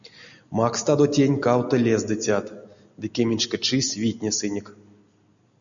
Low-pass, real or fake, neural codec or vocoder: 7.2 kHz; real; none